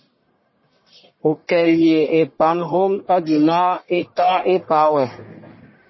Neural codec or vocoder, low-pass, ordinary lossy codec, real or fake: codec, 44.1 kHz, 1.7 kbps, Pupu-Codec; 7.2 kHz; MP3, 24 kbps; fake